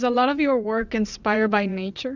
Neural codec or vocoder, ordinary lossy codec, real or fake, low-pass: vocoder, 22.05 kHz, 80 mel bands, WaveNeXt; Opus, 64 kbps; fake; 7.2 kHz